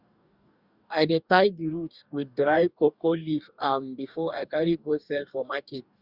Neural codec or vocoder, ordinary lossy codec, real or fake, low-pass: codec, 44.1 kHz, 2.6 kbps, DAC; Opus, 64 kbps; fake; 5.4 kHz